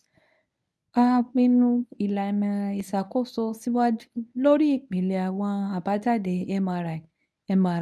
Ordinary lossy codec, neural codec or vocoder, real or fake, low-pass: none; codec, 24 kHz, 0.9 kbps, WavTokenizer, medium speech release version 1; fake; none